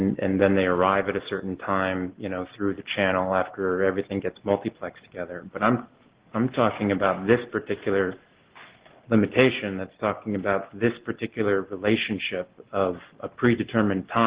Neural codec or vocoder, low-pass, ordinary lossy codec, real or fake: none; 3.6 kHz; Opus, 16 kbps; real